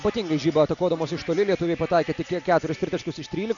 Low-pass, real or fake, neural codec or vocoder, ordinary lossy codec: 7.2 kHz; real; none; MP3, 96 kbps